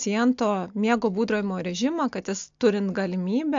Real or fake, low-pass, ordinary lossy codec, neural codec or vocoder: real; 7.2 kHz; AAC, 64 kbps; none